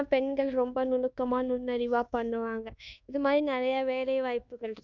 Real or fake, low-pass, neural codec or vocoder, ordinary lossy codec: fake; 7.2 kHz; codec, 24 kHz, 1.2 kbps, DualCodec; none